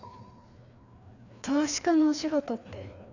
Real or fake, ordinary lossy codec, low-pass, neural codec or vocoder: fake; none; 7.2 kHz; codec, 16 kHz, 2 kbps, FreqCodec, larger model